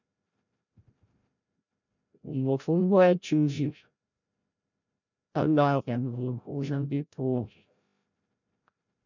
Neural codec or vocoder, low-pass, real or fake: codec, 16 kHz, 0.5 kbps, FreqCodec, larger model; 7.2 kHz; fake